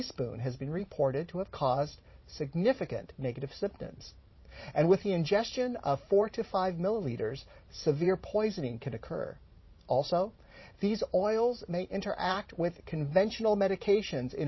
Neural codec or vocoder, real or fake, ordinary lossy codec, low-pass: vocoder, 44.1 kHz, 128 mel bands every 512 samples, BigVGAN v2; fake; MP3, 24 kbps; 7.2 kHz